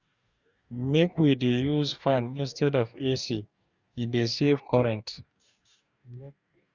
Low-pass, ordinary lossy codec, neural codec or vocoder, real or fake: 7.2 kHz; Opus, 64 kbps; codec, 44.1 kHz, 2.6 kbps, DAC; fake